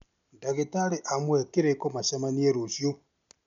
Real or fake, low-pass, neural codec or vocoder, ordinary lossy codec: real; 7.2 kHz; none; none